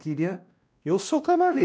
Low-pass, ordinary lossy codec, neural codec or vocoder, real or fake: none; none; codec, 16 kHz, 1 kbps, X-Codec, WavLM features, trained on Multilingual LibriSpeech; fake